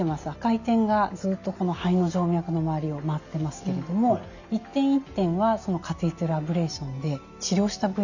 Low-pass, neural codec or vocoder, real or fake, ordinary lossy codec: 7.2 kHz; none; real; none